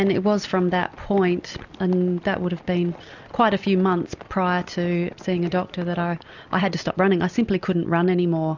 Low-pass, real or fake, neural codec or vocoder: 7.2 kHz; real; none